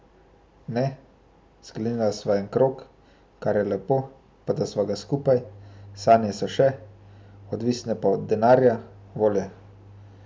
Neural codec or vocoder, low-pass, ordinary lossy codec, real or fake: none; none; none; real